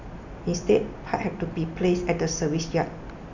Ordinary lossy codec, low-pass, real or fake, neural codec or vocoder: none; 7.2 kHz; real; none